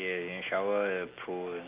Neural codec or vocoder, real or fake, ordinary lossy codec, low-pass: none; real; Opus, 24 kbps; 3.6 kHz